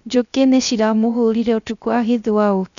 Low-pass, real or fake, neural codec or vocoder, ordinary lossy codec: 7.2 kHz; fake; codec, 16 kHz, 0.3 kbps, FocalCodec; none